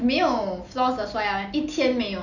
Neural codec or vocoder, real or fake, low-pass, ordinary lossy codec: none; real; 7.2 kHz; none